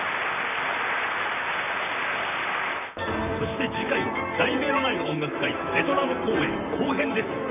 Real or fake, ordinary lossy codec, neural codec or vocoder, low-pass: fake; none; vocoder, 44.1 kHz, 128 mel bands, Pupu-Vocoder; 3.6 kHz